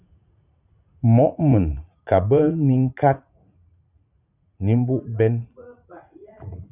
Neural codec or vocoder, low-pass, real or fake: vocoder, 44.1 kHz, 80 mel bands, Vocos; 3.6 kHz; fake